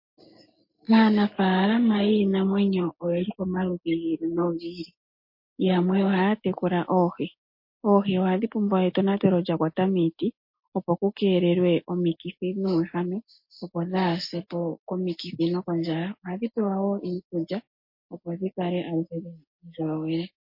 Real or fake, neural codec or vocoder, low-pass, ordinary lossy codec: real; none; 5.4 kHz; MP3, 32 kbps